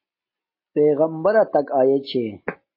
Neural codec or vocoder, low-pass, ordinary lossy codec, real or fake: none; 5.4 kHz; MP3, 24 kbps; real